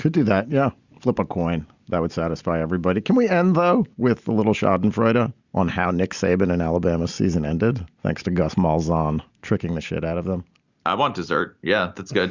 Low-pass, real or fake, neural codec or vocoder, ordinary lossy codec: 7.2 kHz; real; none; Opus, 64 kbps